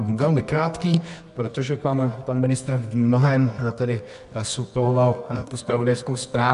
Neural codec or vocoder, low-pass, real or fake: codec, 24 kHz, 0.9 kbps, WavTokenizer, medium music audio release; 10.8 kHz; fake